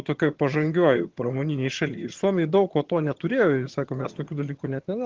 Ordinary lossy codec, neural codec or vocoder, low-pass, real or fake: Opus, 16 kbps; vocoder, 22.05 kHz, 80 mel bands, HiFi-GAN; 7.2 kHz; fake